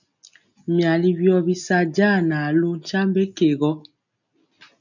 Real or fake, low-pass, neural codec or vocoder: real; 7.2 kHz; none